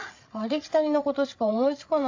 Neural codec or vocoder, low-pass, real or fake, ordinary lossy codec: codec, 16 kHz, 16 kbps, FreqCodec, larger model; 7.2 kHz; fake; AAC, 48 kbps